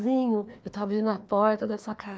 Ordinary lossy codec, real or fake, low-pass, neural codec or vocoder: none; fake; none; codec, 16 kHz, 2 kbps, FreqCodec, larger model